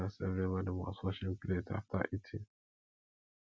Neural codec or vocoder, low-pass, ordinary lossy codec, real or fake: none; none; none; real